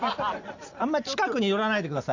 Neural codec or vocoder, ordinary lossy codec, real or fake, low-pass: none; none; real; 7.2 kHz